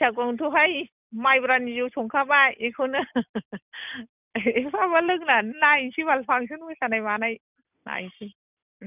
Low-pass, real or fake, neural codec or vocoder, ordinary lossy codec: 3.6 kHz; real; none; none